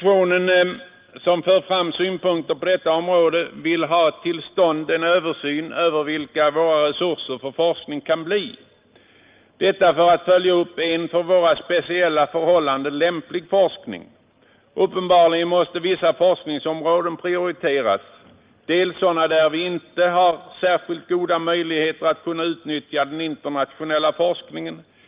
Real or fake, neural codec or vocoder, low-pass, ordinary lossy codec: real; none; 3.6 kHz; Opus, 32 kbps